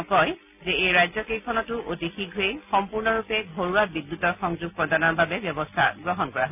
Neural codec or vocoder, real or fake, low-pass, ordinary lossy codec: none; real; 3.6 kHz; none